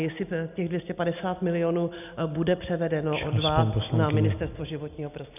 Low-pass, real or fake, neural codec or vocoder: 3.6 kHz; real; none